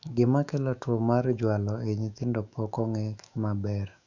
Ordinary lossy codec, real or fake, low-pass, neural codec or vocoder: none; fake; 7.2 kHz; autoencoder, 48 kHz, 128 numbers a frame, DAC-VAE, trained on Japanese speech